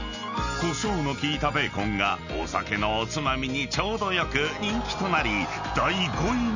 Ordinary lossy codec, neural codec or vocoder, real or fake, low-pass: none; none; real; 7.2 kHz